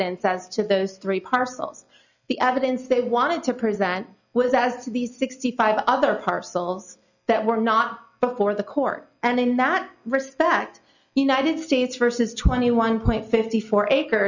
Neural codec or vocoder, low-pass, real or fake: none; 7.2 kHz; real